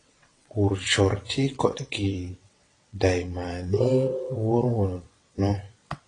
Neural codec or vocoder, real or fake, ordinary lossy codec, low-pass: vocoder, 22.05 kHz, 80 mel bands, WaveNeXt; fake; AAC, 32 kbps; 9.9 kHz